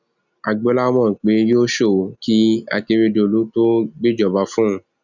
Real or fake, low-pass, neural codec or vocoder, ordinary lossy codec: real; 7.2 kHz; none; none